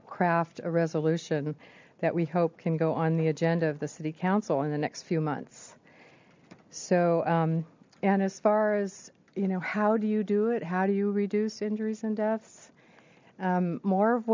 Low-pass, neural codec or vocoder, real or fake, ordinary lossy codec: 7.2 kHz; none; real; MP3, 64 kbps